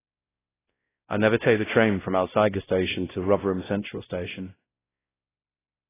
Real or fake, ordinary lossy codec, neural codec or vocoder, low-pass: fake; AAC, 16 kbps; codec, 16 kHz, 0.5 kbps, X-Codec, WavLM features, trained on Multilingual LibriSpeech; 3.6 kHz